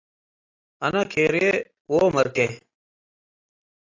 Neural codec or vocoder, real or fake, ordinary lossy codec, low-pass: none; real; AAC, 32 kbps; 7.2 kHz